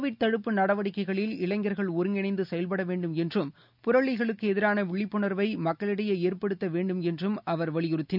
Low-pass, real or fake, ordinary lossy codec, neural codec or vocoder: 5.4 kHz; real; none; none